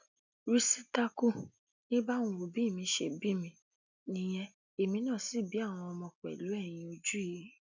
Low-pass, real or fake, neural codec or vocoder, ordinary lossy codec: 7.2 kHz; real; none; none